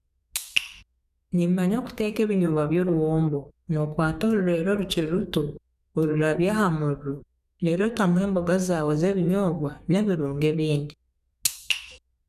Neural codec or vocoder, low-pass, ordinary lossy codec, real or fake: codec, 32 kHz, 1.9 kbps, SNAC; 14.4 kHz; none; fake